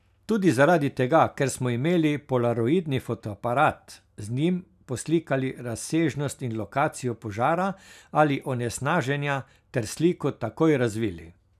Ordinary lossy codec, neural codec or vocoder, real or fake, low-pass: none; none; real; 14.4 kHz